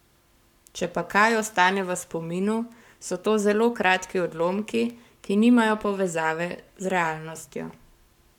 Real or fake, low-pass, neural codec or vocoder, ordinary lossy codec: fake; 19.8 kHz; codec, 44.1 kHz, 7.8 kbps, Pupu-Codec; none